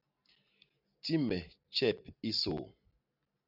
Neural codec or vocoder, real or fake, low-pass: none; real; 5.4 kHz